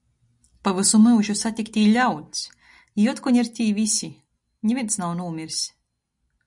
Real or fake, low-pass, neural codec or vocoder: real; 10.8 kHz; none